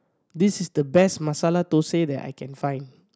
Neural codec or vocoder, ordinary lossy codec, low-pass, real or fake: none; none; none; real